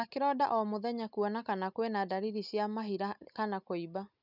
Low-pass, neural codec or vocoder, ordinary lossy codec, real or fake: 5.4 kHz; none; none; real